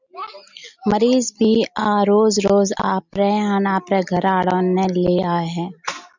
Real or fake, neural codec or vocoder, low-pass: real; none; 7.2 kHz